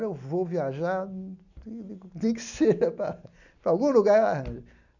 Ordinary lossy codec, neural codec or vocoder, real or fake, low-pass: none; none; real; 7.2 kHz